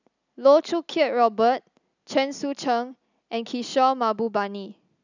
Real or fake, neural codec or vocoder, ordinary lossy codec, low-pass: real; none; none; 7.2 kHz